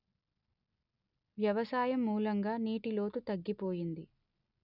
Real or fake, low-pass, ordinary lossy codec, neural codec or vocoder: real; 5.4 kHz; none; none